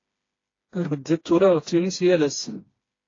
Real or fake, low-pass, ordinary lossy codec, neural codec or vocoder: fake; 7.2 kHz; AAC, 32 kbps; codec, 16 kHz, 2 kbps, FreqCodec, smaller model